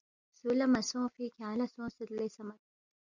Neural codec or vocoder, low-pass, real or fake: none; 7.2 kHz; real